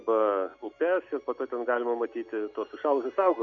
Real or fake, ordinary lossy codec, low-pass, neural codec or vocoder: real; MP3, 96 kbps; 7.2 kHz; none